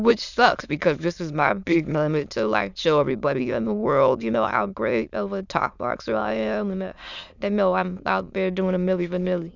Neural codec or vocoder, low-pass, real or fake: autoencoder, 22.05 kHz, a latent of 192 numbers a frame, VITS, trained on many speakers; 7.2 kHz; fake